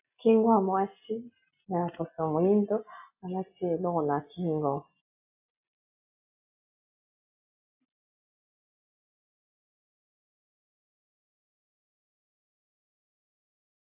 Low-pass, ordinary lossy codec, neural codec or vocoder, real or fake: 3.6 kHz; AAC, 32 kbps; none; real